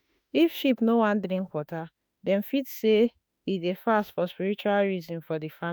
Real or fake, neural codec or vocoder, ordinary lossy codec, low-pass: fake; autoencoder, 48 kHz, 32 numbers a frame, DAC-VAE, trained on Japanese speech; none; none